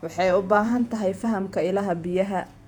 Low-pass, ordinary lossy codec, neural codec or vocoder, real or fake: 19.8 kHz; none; vocoder, 48 kHz, 128 mel bands, Vocos; fake